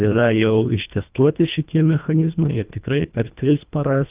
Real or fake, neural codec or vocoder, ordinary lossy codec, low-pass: fake; codec, 24 kHz, 1.5 kbps, HILCodec; Opus, 24 kbps; 3.6 kHz